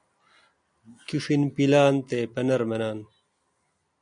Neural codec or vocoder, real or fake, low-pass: none; real; 9.9 kHz